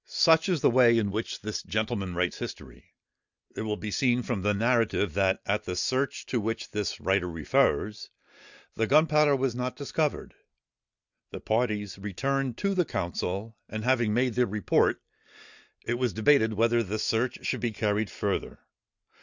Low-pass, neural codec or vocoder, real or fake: 7.2 kHz; none; real